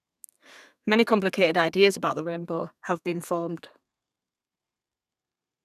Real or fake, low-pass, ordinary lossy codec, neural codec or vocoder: fake; 14.4 kHz; none; codec, 32 kHz, 1.9 kbps, SNAC